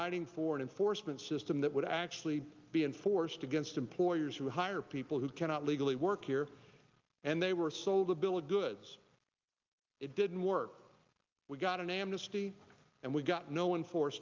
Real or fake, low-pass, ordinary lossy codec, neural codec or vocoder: real; 7.2 kHz; Opus, 24 kbps; none